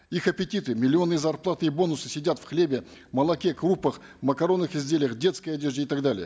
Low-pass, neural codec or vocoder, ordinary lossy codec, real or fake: none; none; none; real